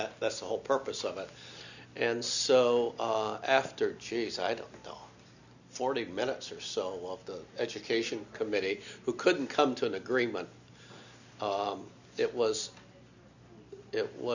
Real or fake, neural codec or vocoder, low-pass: real; none; 7.2 kHz